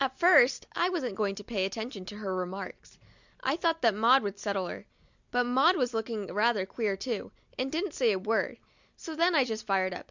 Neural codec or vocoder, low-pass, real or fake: none; 7.2 kHz; real